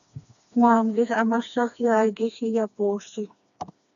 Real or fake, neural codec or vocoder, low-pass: fake; codec, 16 kHz, 2 kbps, FreqCodec, smaller model; 7.2 kHz